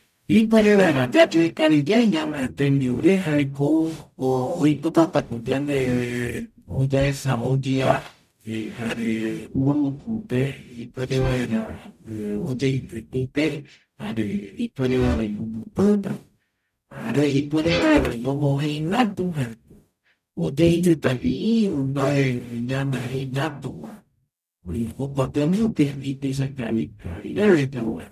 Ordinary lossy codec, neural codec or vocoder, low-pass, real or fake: none; codec, 44.1 kHz, 0.9 kbps, DAC; 14.4 kHz; fake